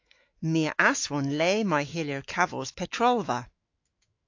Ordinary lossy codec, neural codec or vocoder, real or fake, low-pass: AAC, 48 kbps; autoencoder, 48 kHz, 128 numbers a frame, DAC-VAE, trained on Japanese speech; fake; 7.2 kHz